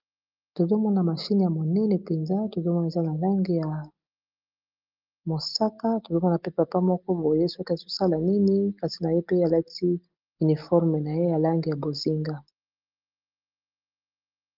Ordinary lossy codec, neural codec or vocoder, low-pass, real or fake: Opus, 24 kbps; none; 5.4 kHz; real